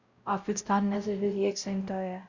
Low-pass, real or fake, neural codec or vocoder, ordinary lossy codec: 7.2 kHz; fake; codec, 16 kHz, 0.5 kbps, X-Codec, WavLM features, trained on Multilingual LibriSpeech; Opus, 64 kbps